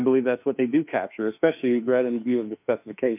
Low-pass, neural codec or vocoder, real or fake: 3.6 kHz; autoencoder, 48 kHz, 32 numbers a frame, DAC-VAE, trained on Japanese speech; fake